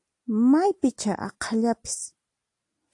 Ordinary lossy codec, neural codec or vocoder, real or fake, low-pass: AAC, 48 kbps; none; real; 10.8 kHz